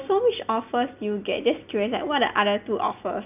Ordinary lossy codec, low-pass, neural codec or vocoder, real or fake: none; 3.6 kHz; none; real